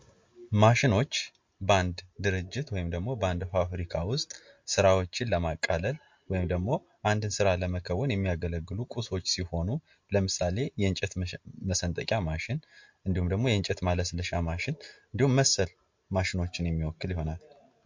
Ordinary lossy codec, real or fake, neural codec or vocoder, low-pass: MP3, 48 kbps; real; none; 7.2 kHz